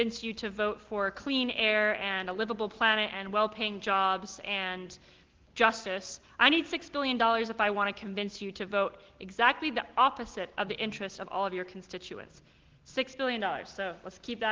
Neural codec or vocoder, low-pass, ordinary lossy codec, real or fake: none; 7.2 kHz; Opus, 16 kbps; real